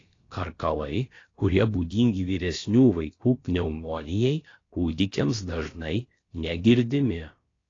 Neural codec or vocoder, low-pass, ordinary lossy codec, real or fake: codec, 16 kHz, about 1 kbps, DyCAST, with the encoder's durations; 7.2 kHz; AAC, 32 kbps; fake